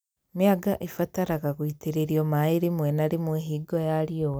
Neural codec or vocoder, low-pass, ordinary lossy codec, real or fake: none; none; none; real